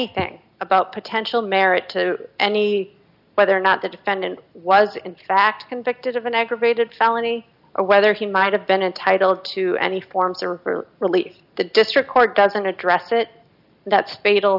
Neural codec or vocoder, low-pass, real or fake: none; 5.4 kHz; real